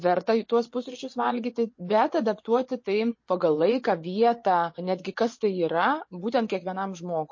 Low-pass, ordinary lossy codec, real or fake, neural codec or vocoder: 7.2 kHz; MP3, 32 kbps; fake; vocoder, 24 kHz, 100 mel bands, Vocos